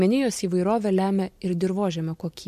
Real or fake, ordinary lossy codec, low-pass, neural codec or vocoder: real; MP3, 64 kbps; 14.4 kHz; none